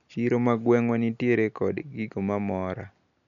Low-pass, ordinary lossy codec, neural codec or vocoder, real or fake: 7.2 kHz; none; none; real